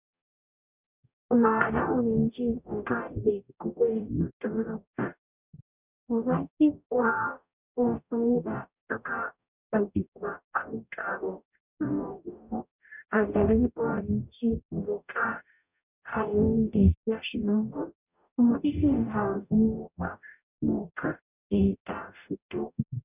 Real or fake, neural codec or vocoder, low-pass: fake; codec, 44.1 kHz, 0.9 kbps, DAC; 3.6 kHz